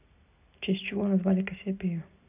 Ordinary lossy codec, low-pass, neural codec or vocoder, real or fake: none; 3.6 kHz; none; real